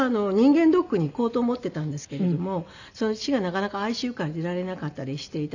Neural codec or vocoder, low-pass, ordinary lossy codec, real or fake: none; 7.2 kHz; none; real